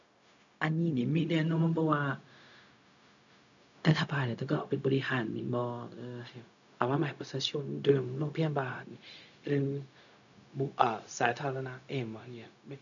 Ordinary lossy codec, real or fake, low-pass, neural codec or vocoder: none; fake; 7.2 kHz; codec, 16 kHz, 0.4 kbps, LongCat-Audio-Codec